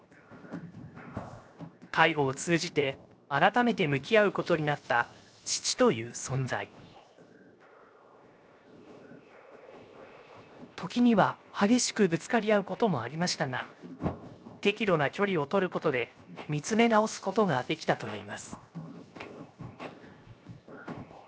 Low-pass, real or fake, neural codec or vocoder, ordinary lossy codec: none; fake; codec, 16 kHz, 0.7 kbps, FocalCodec; none